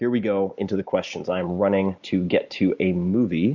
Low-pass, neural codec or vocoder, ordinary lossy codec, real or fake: 7.2 kHz; none; Opus, 64 kbps; real